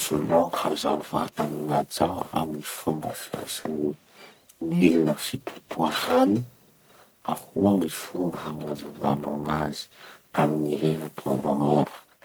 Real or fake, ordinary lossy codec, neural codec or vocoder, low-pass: fake; none; codec, 44.1 kHz, 1.7 kbps, Pupu-Codec; none